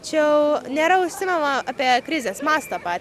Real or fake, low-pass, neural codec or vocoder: real; 14.4 kHz; none